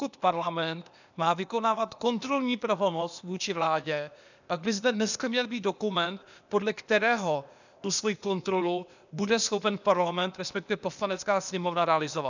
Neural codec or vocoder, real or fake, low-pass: codec, 16 kHz, 0.8 kbps, ZipCodec; fake; 7.2 kHz